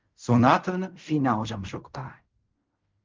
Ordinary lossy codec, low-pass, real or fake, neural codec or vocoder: Opus, 32 kbps; 7.2 kHz; fake; codec, 16 kHz in and 24 kHz out, 0.4 kbps, LongCat-Audio-Codec, fine tuned four codebook decoder